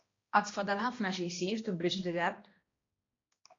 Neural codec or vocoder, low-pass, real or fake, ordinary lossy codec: codec, 16 kHz, 1 kbps, X-Codec, HuBERT features, trained on balanced general audio; 7.2 kHz; fake; AAC, 32 kbps